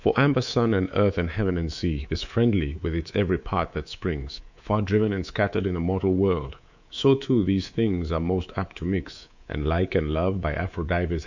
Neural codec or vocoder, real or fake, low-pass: codec, 24 kHz, 3.1 kbps, DualCodec; fake; 7.2 kHz